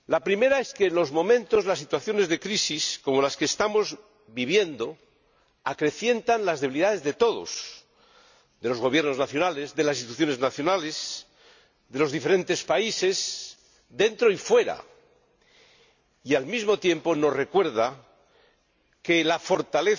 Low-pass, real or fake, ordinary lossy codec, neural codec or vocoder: 7.2 kHz; real; none; none